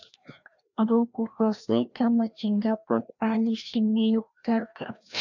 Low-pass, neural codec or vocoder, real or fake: 7.2 kHz; codec, 16 kHz, 1 kbps, FreqCodec, larger model; fake